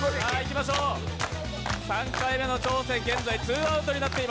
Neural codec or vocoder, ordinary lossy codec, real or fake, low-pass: none; none; real; none